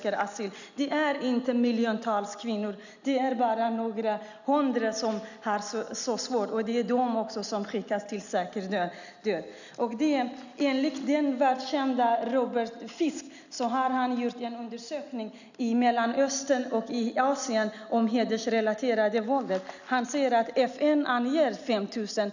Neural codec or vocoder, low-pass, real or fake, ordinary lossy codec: none; 7.2 kHz; real; none